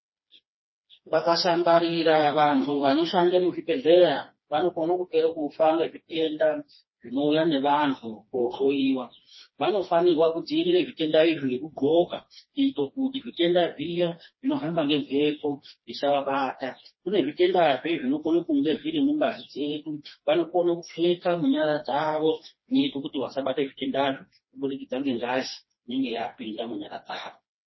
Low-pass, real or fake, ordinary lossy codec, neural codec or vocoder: 7.2 kHz; fake; MP3, 24 kbps; codec, 16 kHz, 2 kbps, FreqCodec, smaller model